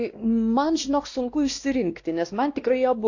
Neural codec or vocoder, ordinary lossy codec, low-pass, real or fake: codec, 16 kHz, 1 kbps, X-Codec, WavLM features, trained on Multilingual LibriSpeech; AAC, 48 kbps; 7.2 kHz; fake